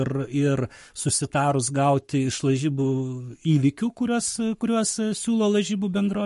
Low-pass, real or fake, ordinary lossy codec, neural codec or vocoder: 14.4 kHz; fake; MP3, 48 kbps; codec, 44.1 kHz, 7.8 kbps, Pupu-Codec